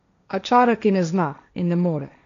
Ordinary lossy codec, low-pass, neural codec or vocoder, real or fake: none; 7.2 kHz; codec, 16 kHz, 1.1 kbps, Voila-Tokenizer; fake